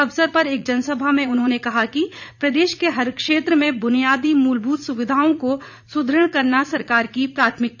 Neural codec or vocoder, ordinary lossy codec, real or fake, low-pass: vocoder, 44.1 kHz, 80 mel bands, Vocos; none; fake; 7.2 kHz